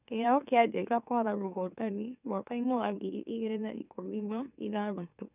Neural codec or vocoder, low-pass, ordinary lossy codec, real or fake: autoencoder, 44.1 kHz, a latent of 192 numbers a frame, MeloTTS; 3.6 kHz; none; fake